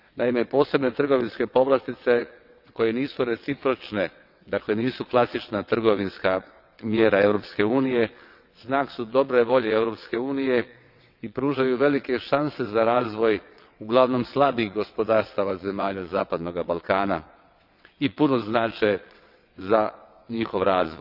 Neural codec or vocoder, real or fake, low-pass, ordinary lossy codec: vocoder, 22.05 kHz, 80 mel bands, WaveNeXt; fake; 5.4 kHz; none